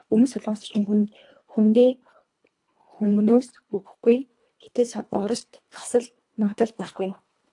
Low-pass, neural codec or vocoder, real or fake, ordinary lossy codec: 10.8 kHz; codec, 24 kHz, 1.5 kbps, HILCodec; fake; AAC, 64 kbps